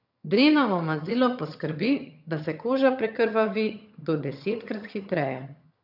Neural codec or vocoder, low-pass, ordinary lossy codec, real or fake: vocoder, 22.05 kHz, 80 mel bands, HiFi-GAN; 5.4 kHz; none; fake